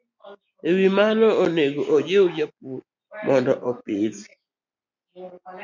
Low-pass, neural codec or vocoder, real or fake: 7.2 kHz; none; real